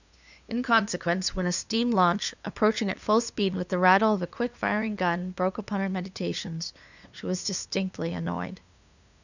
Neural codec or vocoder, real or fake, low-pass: codec, 16 kHz, 2 kbps, FunCodec, trained on LibriTTS, 25 frames a second; fake; 7.2 kHz